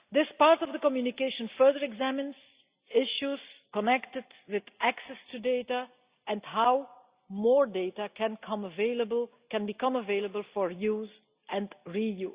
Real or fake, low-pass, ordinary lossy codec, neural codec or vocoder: real; 3.6 kHz; Opus, 64 kbps; none